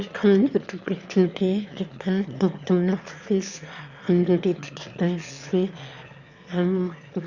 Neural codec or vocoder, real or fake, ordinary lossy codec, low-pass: autoencoder, 22.05 kHz, a latent of 192 numbers a frame, VITS, trained on one speaker; fake; Opus, 64 kbps; 7.2 kHz